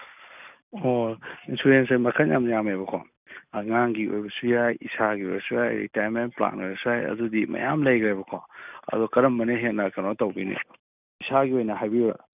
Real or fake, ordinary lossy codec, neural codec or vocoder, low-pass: real; none; none; 3.6 kHz